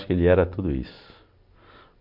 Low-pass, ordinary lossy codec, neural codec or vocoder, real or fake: 5.4 kHz; MP3, 48 kbps; none; real